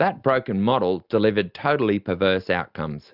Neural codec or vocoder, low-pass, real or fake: none; 5.4 kHz; real